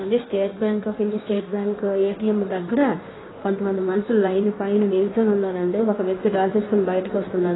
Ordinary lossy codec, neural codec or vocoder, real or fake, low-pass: AAC, 16 kbps; codec, 16 kHz in and 24 kHz out, 1.1 kbps, FireRedTTS-2 codec; fake; 7.2 kHz